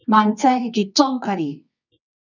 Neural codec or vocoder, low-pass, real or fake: codec, 24 kHz, 0.9 kbps, WavTokenizer, medium music audio release; 7.2 kHz; fake